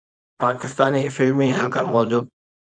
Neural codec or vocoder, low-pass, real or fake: codec, 24 kHz, 0.9 kbps, WavTokenizer, small release; 9.9 kHz; fake